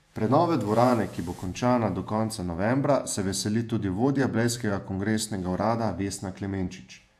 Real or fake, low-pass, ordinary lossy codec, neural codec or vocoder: fake; 14.4 kHz; AAC, 96 kbps; vocoder, 48 kHz, 128 mel bands, Vocos